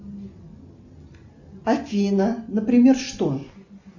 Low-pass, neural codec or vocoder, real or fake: 7.2 kHz; none; real